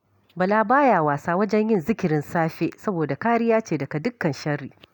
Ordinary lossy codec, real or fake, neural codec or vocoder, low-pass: none; real; none; 19.8 kHz